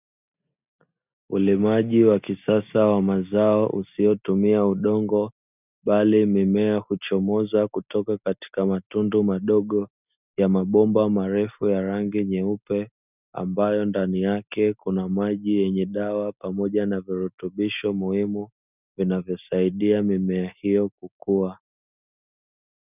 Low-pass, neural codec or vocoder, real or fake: 3.6 kHz; none; real